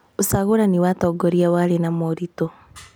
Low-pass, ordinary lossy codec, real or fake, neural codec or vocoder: none; none; real; none